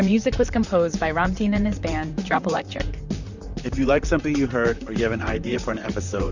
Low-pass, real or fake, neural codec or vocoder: 7.2 kHz; fake; vocoder, 44.1 kHz, 128 mel bands, Pupu-Vocoder